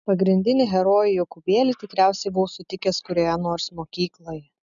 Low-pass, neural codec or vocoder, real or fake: 7.2 kHz; none; real